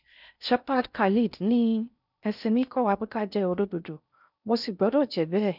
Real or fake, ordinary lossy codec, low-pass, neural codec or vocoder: fake; none; 5.4 kHz; codec, 16 kHz in and 24 kHz out, 0.6 kbps, FocalCodec, streaming, 4096 codes